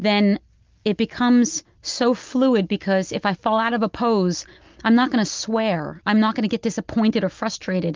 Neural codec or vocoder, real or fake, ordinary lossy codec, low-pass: none; real; Opus, 24 kbps; 7.2 kHz